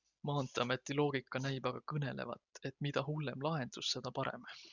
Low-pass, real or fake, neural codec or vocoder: 7.2 kHz; real; none